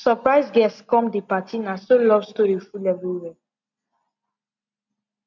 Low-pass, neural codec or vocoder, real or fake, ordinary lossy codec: 7.2 kHz; none; real; none